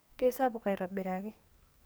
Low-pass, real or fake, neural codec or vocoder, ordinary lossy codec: none; fake; codec, 44.1 kHz, 2.6 kbps, SNAC; none